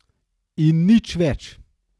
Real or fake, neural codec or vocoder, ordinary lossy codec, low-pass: real; none; none; none